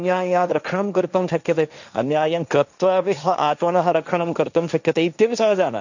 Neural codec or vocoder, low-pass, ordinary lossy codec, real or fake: codec, 16 kHz, 1.1 kbps, Voila-Tokenizer; 7.2 kHz; none; fake